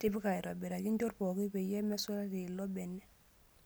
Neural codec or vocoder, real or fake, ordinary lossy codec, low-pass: none; real; none; none